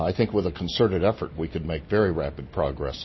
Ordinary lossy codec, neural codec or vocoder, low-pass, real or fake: MP3, 24 kbps; none; 7.2 kHz; real